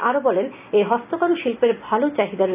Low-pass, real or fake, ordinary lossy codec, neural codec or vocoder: 3.6 kHz; real; none; none